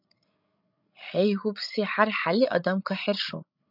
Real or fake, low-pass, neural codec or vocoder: fake; 5.4 kHz; codec, 16 kHz, 16 kbps, FreqCodec, larger model